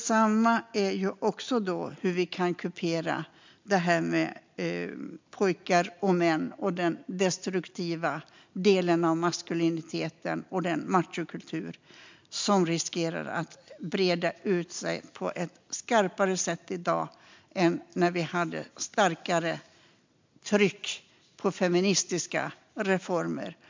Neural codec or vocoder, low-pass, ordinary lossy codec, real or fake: none; 7.2 kHz; MP3, 64 kbps; real